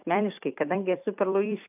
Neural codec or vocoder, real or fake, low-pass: vocoder, 44.1 kHz, 128 mel bands every 256 samples, BigVGAN v2; fake; 3.6 kHz